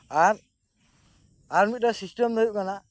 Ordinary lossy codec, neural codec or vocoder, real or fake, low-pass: none; none; real; none